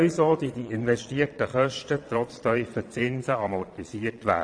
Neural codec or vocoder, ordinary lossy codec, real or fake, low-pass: vocoder, 22.05 kHz, 80 mel bands, Vocos; AAC, 64 kbps; fake; 9.9 kHz